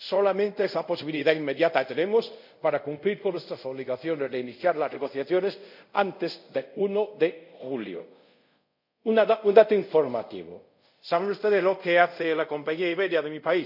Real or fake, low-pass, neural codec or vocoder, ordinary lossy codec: fake; 5.4 kHz; codec, 24 kHz, 0.5 kbps, DualCodec; none